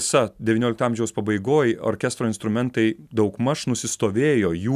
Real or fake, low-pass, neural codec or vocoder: real; 14.4 kHz; none